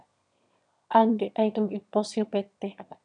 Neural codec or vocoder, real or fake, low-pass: autoencoder, 22.05 kHz, a latent of 192 numbers a frame, VITS, trained on one speaker; fake; 9.9 kHz